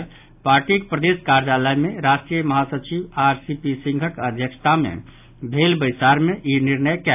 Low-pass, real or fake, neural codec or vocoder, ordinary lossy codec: 3.6 kHz; real; none; AAC, 32 kbps